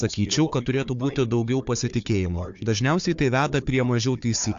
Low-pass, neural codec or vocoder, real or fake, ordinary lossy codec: 7.2 kHz; codec, 16 kHz, 4 kbps, FunCodec, trained on Chinese and English, 50 frames a second; fake; AAC, 64 kbps